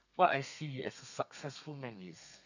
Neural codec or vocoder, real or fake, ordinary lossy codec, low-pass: codec, 32 kHz, 1.9 kbps, SNAC; fake; none; 7.2 kHz